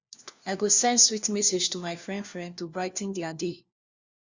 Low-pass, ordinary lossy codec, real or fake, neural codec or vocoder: 7.2 kHz; Opus, 64 kbps; fake; codec, 16 kHz, 1 kbps, FunCodec, trained on LibriTTS, 50 frames a second